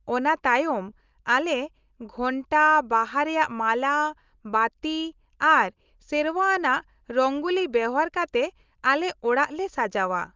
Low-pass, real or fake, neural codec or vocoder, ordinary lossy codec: 7.2 kHz; real; none; Opus, 24 kbps